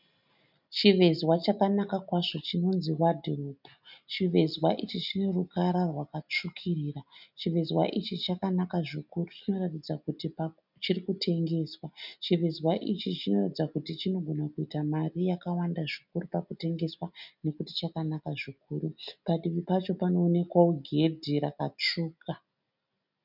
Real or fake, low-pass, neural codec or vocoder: real; 5.4 kHz; none